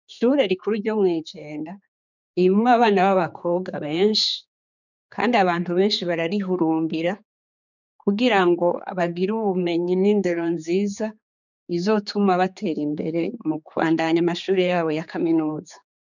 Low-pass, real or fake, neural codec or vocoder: 7.2 kHz; fake; codec, 16 kHz, 4 kbps, X-Codec, HuBERT features, trained on general audio